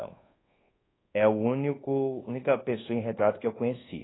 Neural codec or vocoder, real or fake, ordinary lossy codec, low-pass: codec, 24 kHz, 1.2 kbps, DualCodec; fake; AAC, 16 kbps; 7.2 kHz